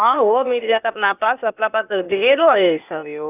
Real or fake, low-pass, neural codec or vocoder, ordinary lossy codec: fake; 3.6 kHz; codec, 16 kHz, 0.8 kbps, ZipCodec; none